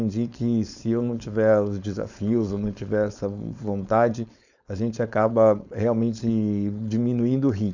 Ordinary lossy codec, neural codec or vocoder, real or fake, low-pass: none; codec, 16 kHz, 4.8 kbps, FACodec; fake; 7.2 kHz